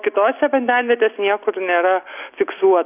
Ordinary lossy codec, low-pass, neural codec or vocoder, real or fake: AAC, 32 kbps; 3.6 kHz; none; real